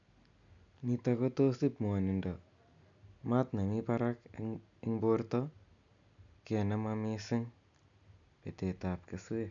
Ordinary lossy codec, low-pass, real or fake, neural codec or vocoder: none; 7.2 kHz; real; none